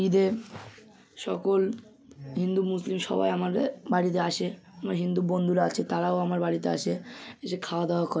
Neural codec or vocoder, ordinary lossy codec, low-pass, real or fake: none; none; none; real